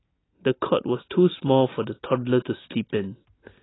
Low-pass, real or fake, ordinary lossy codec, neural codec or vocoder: 7.2 kHz; real; AAC, 16 kbps; none